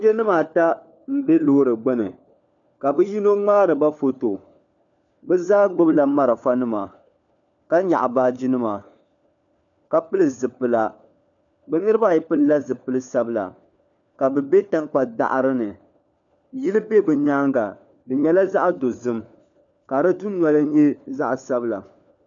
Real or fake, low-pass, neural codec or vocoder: fake; 7.2 kHz; codec, 16 kHz, 4 kbps, FunCodec, trained on LibriTTS, 50 frames a second